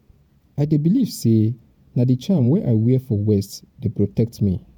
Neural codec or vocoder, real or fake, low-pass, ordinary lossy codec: vocoder, 48 kHz, 128 mel bands, Vocos; fake; 19.8 kHz; MP3, 96 kbps